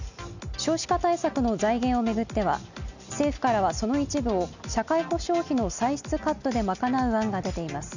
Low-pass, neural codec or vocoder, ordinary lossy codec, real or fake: 7.2 kHz; none; none; real